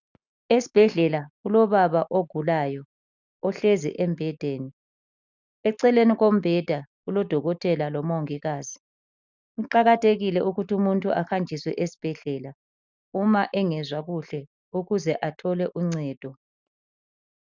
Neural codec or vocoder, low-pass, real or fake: none; 7.2 kHz; real